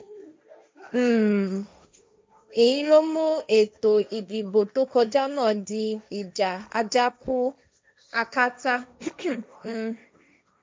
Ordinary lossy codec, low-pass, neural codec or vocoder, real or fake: none; none; codec, 16 kHz, 1.1 kbps, Voila-Tokenizer; fake